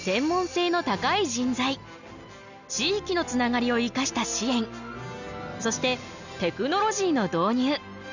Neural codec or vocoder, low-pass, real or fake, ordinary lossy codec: none; 7.2 kHz; real; none